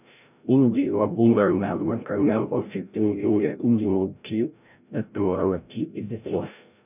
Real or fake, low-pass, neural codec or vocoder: fake; 3.6 kHz; codec, 16 kHz, 0.5 kbps, FreqCodec, larger model